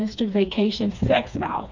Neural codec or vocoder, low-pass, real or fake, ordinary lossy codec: codec, 16 kHz, 2 kbps, FreqCodec, smaller model; 7.2 kHz; fake; MP3, 64 kbps